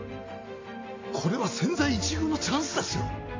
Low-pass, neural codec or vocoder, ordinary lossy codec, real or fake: 7.2 kHz; none; AAC, 32 kbps; real